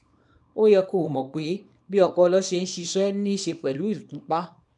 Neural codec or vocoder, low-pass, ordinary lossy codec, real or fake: codec, 24 kHz, 0.9 kbps, WavTokenizer, small release; 10.8 kHz; none; fake